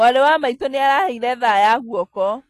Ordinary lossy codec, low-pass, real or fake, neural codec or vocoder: AAC, 48 kbps; 14.4 kHz; real; none